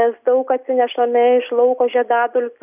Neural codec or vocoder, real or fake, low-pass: none; real; 3.6 kHz